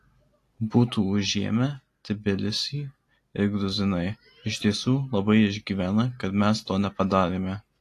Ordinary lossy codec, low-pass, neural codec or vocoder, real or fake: AAC, 48 kbps; 14.4 kHz; none; real